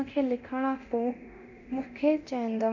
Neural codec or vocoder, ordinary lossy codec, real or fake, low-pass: codec, 24 kHz, 0.9 kbps, DualCodec; none; fake; 7.2 kHz